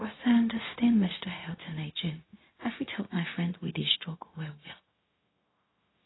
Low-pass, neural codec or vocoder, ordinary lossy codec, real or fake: 7.2 kHz; codec, 16 kHz, 0.4 kbps, LongCat-Audio-Codec; AAC, 16 kbps; fake